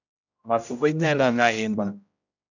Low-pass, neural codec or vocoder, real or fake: 7.2 kHz; codec, 16 kHz, 0.5 kbps, X-Codec, HuBERT features, trained on general audio; fake